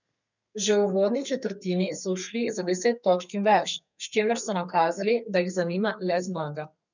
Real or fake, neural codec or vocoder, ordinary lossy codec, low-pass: fake; codec, 32 kHz, 1.9 kbps, SNAC; none; 7.2 kHz